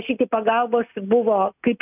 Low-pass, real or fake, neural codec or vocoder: 3.6 kHz; real; none